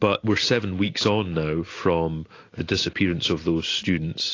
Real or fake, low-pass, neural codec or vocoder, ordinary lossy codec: real; 7.2 kHz; none; AAC, 32 kbps